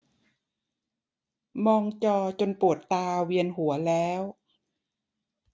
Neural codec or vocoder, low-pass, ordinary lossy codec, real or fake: none; none; none; real